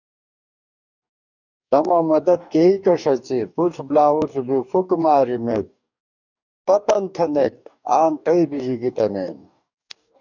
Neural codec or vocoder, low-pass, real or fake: codec, 44.1 kHz, 2.6 kbps, DAC; 7.2 kHz; fake